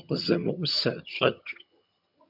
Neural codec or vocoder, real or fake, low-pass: vocoder, 22.05 kHz, 80 mel bands, HiFi-GAN; fake; 5.4 kHz